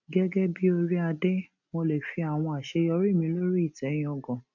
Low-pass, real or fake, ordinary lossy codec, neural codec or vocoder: 7.2 kHz; real; none; none